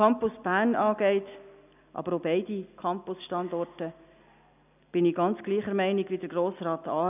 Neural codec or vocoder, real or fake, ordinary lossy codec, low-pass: none; real; none; 3.6 kHz